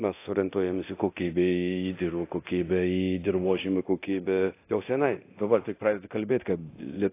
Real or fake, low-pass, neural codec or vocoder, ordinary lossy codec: fake; 3.6 kHz; codec, 24 kHz, 0.9 kbps, DualCodec; AAC, 24 kbps